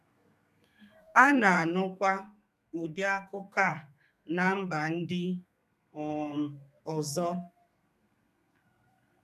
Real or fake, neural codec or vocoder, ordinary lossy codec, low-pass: fake; codec, 32 kHz, 1.9 kbps, SNAC; none; 14.4 kHz